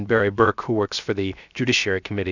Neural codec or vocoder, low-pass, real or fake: codec, 16 kHz, 0.7 kbps, FocalCodec; 7.2 kHz; fake